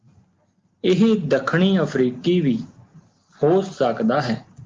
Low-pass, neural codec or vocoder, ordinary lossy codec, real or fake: 7.2 kHz; none; Opus, 32 kbps; real